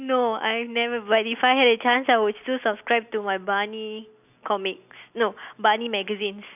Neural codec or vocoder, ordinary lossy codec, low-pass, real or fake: none; none; 3.6 kHz; real